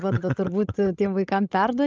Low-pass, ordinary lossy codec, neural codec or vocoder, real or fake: 7.2 kHz; Opus, 24 kbps; codec, 16 kHz, 16 kbps, FreqCodec, larger model; fake